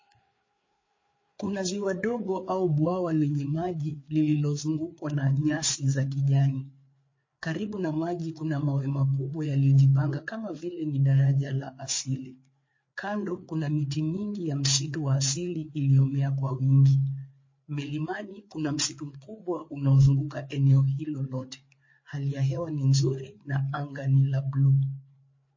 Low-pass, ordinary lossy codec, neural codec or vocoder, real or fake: 7.2 kHz; MP3, 32 kbps; codec, 16 kHz, 4 kbps, FreqCodec, larger model; fake